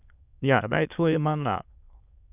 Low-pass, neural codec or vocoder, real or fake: 3.6 kHz; autoencoder, 22.05 kHz, a latent of 192 numbers a frame, VITS, trained on many speakers; fake